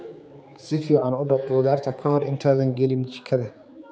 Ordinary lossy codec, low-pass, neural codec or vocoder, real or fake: none; none; codec, 16 kHz, 4 kbps, X-Codec, HuBERT features, trained on general audio; fake